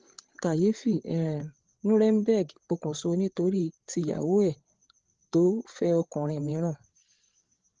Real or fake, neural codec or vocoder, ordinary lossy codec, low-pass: fake; codec, 16 kHz, 8 kbps, FreqCodec, larger model; Opus, 16 kbps; 7.2 kHz